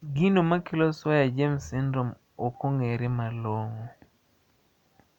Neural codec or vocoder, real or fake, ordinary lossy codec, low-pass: none; real; none; 19.8 kHz